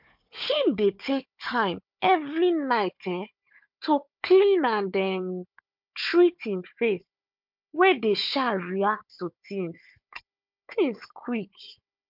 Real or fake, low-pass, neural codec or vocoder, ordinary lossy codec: fake; 5.4 kHz; codec, 16 kHz, 4 kbps, FunCodec, trained on Chinese and English, 50 frames a second; MP3, 48 kbps